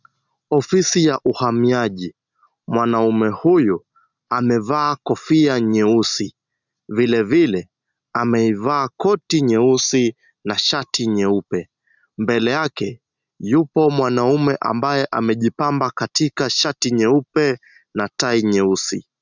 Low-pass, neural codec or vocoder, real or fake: 7.2 kHz; none; real